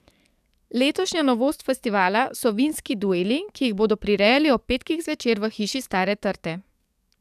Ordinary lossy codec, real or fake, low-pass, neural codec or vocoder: none; fake; 14.4 kHz; codec, 44.1 kHz, 7.8 kbps, DAC